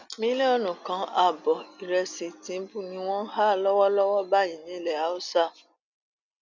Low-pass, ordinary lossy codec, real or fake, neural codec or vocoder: 7.2 kHz; none; real; none